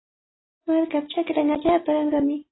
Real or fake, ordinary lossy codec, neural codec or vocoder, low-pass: real; AAC, 16 kbps; none; 7.2 kHz